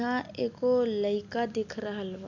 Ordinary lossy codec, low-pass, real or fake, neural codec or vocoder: none; 7.2 kHz; real; none